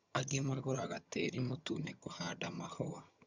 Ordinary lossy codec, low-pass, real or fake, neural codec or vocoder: Opus, 64 kbps; 7.2 kHz; fake; vocoder, 22.05 kHz, 80 mel bands, HiFi-GAN